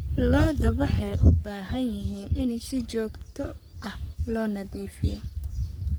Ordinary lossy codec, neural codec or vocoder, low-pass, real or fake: none; codec, 44.1 kHz, 3.4 kbps, Pupu-Codec; none; fake